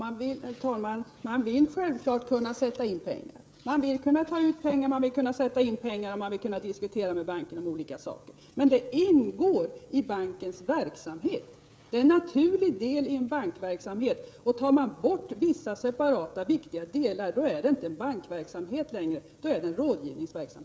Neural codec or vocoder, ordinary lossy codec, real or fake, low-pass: codec, 16 kHz, 16 kbps, FreqCodec, smaller model; none; fake; none